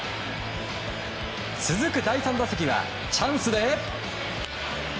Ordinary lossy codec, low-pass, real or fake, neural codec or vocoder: none; none; real; none